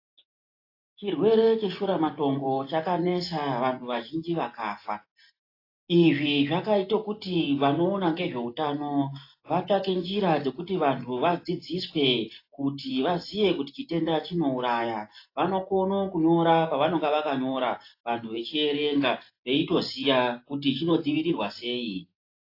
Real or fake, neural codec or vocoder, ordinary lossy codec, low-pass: real; none; AAC, 32 kbps; 5.4 kHz